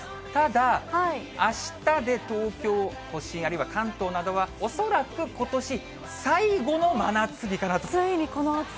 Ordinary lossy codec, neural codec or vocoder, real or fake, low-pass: none; none; real; none